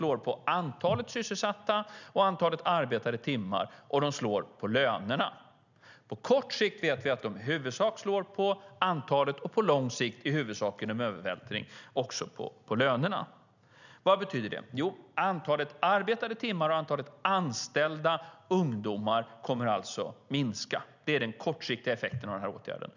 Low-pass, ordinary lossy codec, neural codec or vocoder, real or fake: 7.2 kHz; none; none; real